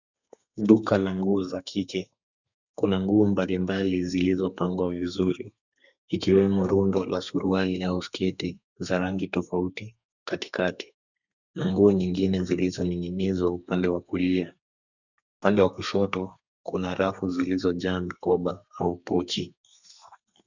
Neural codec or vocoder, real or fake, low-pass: codec, 32 kHz, 1.9 kbps, SNAC; fake; 7.2 kHz